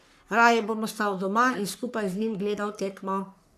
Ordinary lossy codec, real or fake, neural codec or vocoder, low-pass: AAC, 96 kbps; fake; codec, 44.1 kHz, 3.4 kbps, Pupu-Codec; 14.4 kHz